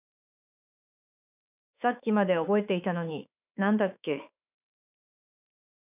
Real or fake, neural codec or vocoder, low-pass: fake; autoencoder, 48 kHz, 32 numbers a frame, DAC-VAE, trained on Japanese speech; 3.6 kHz